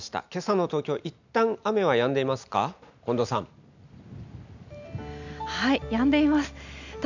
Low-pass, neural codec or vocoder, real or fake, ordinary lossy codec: 7.2 kHz; none; real; none